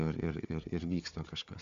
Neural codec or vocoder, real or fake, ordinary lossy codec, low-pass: codec, 16 kHz, 4 kbps, FunCodec, trained on Chinese and English, 50 frames a second; fake; MP3, 64 kbps; 7.2 kHz